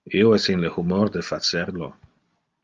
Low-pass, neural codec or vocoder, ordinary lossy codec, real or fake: 7.2 kHz; none; Opus, 32 kbps; real